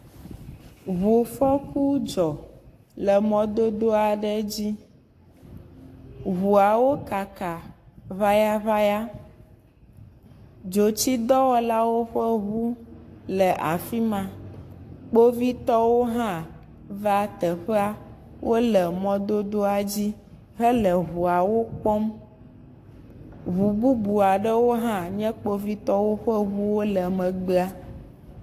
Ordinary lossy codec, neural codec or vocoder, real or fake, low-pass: AAC, 64 kbps; codec, 44.1 kHz, 7.8 kbps, Pupu-Codec; fake; 14.4 kHz